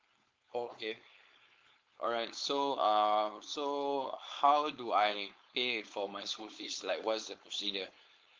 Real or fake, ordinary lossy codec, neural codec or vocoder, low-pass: fake; Opus, 32 kbps; codec, 16 kHz, 4.8 kbps, FACodec; 7.2 kHz